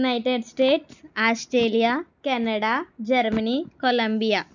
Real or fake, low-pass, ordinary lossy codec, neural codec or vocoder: real; 7.2 kHz; none; none